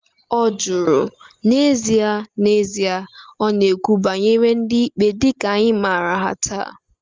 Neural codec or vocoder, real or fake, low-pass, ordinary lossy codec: none; real; 7.2 kHz; Opus, 32 kbps